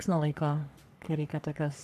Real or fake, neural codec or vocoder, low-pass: fake; codec, 44.1 kHz, 3.4 kbps, Pupu-Codec; 14.4 kHz